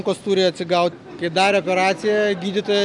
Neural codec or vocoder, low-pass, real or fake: none; 10.8 kHz; real